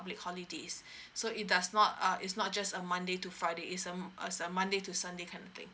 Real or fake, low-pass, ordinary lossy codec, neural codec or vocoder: real; none; none; none